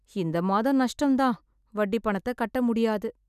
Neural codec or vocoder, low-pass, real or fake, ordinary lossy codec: none; 14.4 kHz; real; none